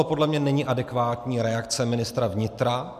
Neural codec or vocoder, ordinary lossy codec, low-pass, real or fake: none; MP3, 96 kbps; 14.4 kHz; real